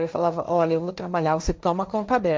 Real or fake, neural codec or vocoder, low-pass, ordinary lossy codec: fake; codec, 16 kHz, 1.1 kbps, Voila-Tokenizer; none; none